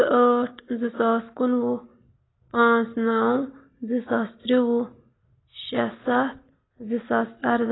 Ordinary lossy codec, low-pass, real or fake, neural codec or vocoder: AAC, 16 kbps; 7.2 kHz; real; none